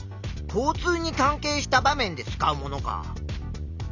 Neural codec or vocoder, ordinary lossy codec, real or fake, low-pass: none; none; real; 7.2 kHz